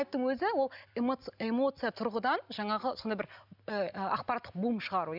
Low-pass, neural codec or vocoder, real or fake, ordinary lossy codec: 5.4 kHz; none; real; none